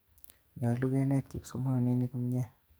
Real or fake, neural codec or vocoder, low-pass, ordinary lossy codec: fake; codec, 44.1 kHz, 2.6 kbps, SNAC; none; none